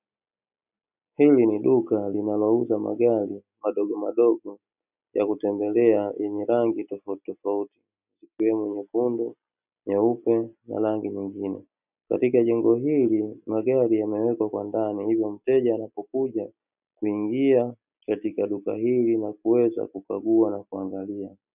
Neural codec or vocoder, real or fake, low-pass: none; real; 3.6 kHz